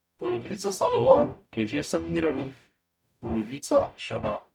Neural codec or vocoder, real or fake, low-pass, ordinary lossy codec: codec, 44.1 kHz, 0.9 kbps, DAC; fake; 19.8 kHz; none